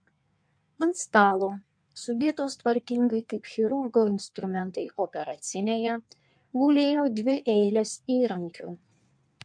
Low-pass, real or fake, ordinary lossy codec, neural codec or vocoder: 9.9 kHz; fake; MP3, 64 kbps; codec, 16 kHz in and 24 kHz out, 1.1 kbps, FireRedTTS-2 codec